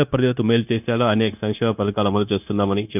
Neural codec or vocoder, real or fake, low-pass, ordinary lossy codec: codec, 16 kHz, 0.9 kbps, LongCat-Audio-Codec; fake; 3.6 kHz; none